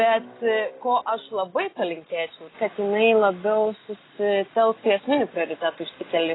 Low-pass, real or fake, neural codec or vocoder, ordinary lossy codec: 7.2 kHz; real; none; AAC, 16 kbps